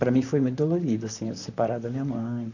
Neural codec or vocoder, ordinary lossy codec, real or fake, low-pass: vocoder, 44.1 kHz, 128 mel bands, Pupu-Vocoder; none; fake; 7.2 kHz